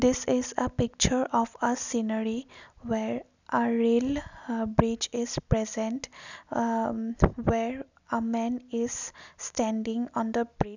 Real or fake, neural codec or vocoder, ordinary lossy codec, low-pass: real; none; none; 7.2 kHz